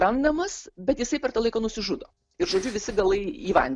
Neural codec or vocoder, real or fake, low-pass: none; real; 9.9 kHz